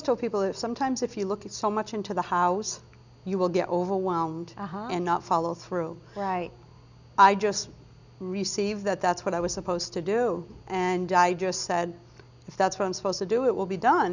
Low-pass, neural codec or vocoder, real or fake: 7.2 kHz; none; real